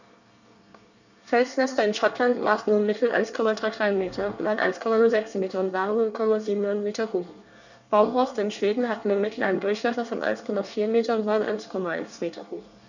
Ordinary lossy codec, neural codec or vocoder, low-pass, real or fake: none; codec, 24 kHz, 1 kbps, SNAC; 7.2 kHz; fake